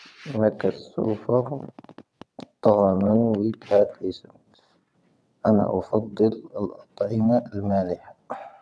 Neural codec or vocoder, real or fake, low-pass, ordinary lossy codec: vocoder, 22.05 kHz, 80 mel bands, WaveNeXt; fake; none; none